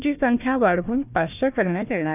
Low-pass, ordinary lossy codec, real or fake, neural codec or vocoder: 3.6 kHz; none; fake; codec, 16 kHz, 1 kbps, FunCodec, trained on Chinese and English, 50 frames a second